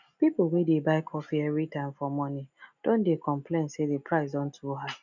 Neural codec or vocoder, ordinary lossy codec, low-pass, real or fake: none; none; 7.2 kHz; real